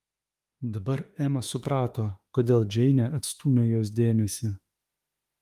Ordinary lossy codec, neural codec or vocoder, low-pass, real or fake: Opus, 32 kbps; autoencoder, 48 kHz, 32 numbers a frame, DAC-VAE, trained on Japanese speech; 14.4 kHz; fake